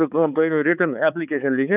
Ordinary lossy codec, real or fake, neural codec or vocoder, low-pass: none; fake; codec, 16 kHz, 4 kbps, X-Codec, HuBERT features, trained on balanced general audio; 3.6 kHz